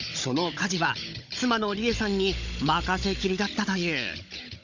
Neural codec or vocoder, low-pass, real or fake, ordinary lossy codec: codec, 16 kHz, 16 kbps, FunCodec, trained on Chinese and English, 50 frames a second; 7.2 kHz; fake; none